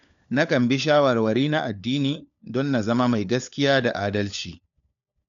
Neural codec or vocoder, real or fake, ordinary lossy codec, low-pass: codec, 16 kHz, 4 kbps, FunCodec, trained on LibriTTS, 50 frames a second; fake; none; 7.2 kHz